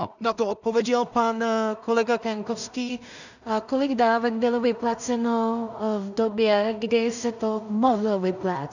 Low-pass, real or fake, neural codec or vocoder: 7.2 kHz; fake; codec, 16 kHz in and 24 kHz out, 0.4 kbps, LongCat-Audio-Codec, two codebook decoder